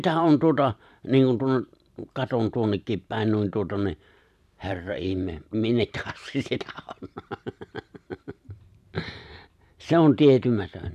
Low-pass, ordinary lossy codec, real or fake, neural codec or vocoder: 14.4 kHz; none; fake; vocoder, 44.1 kHz, 128 mel bands every 512 samples, BigVGAN v2